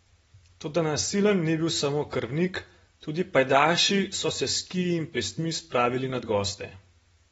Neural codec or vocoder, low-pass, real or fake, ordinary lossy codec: none; 19.8 kHz; real; AAC, 24 kbps